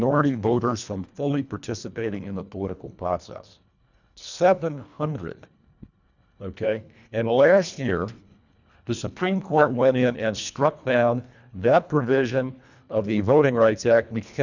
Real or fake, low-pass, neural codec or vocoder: fake; 7.2 kHz; codec, 24 kHz, 1.5 kbps, HILCodec